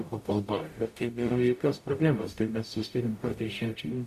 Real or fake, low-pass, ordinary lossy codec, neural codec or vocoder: fake; 14.4 kHz; MP3, 64 kbps; codec, 44.1 kHz, 0.9 kbps, DAC